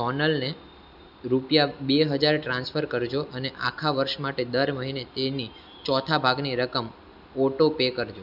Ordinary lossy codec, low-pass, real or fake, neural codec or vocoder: none; 5.4 kHz; real; none